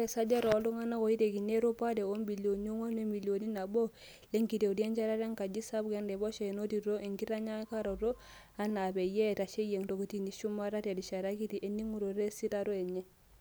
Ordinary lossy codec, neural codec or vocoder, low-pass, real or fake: none; none; none; real